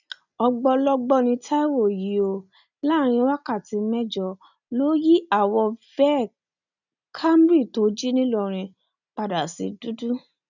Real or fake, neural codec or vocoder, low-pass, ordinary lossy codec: real; none; 7.2 kHz; none